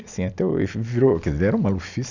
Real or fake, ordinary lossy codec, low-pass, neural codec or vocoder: real; none; 7.2 kHz; none